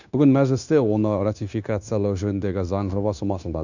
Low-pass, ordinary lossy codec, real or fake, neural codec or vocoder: 7.2 kHz; none; fake; codec, 16 kHz, 0.9 kbps, LongCat-Audio-Codec